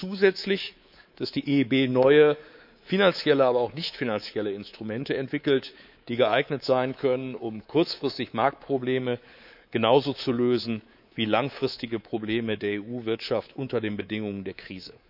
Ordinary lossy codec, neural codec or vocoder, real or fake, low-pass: none; codec, 24 kHz, 3.1 kbps, DualCodec; fake; 5.4 kHz